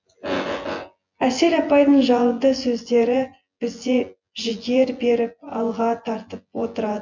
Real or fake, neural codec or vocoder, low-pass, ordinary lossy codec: fake; vocoder, 24 kHz, 100 mel bands, Vocos; 7.2 kHz; MP3, 48 kbps